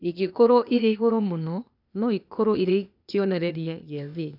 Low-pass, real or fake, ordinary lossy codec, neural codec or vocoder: 5.4 kHz; fake; none; codec, 16 kHz, 0.8 kbps, ZipCodec